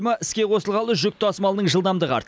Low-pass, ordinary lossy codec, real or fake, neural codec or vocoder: none; none; real; none